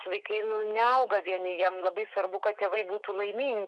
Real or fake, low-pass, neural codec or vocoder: fake; 10.8 kHz; autoencoder, 48 kHz, 128 numbers a frame, DAC-VAE, trained on Japanese speech